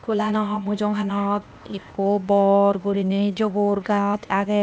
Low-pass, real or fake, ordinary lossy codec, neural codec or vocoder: none; fake; none; codec, 16 kHz, 0.8 kbps, ZipCodec